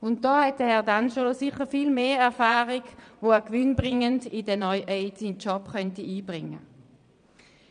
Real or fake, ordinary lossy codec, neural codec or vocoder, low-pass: fake; none; vocoder, 22.05 kHz, 80 mel bands, Vocos; 9.9 kHz